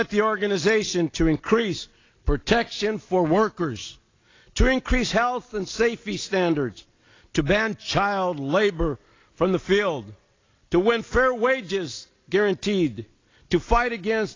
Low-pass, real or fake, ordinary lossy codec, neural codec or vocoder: 7.2 kHz; real; AAC, 32 kbps; none